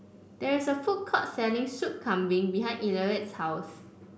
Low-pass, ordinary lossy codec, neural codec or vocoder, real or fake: none; none; none; real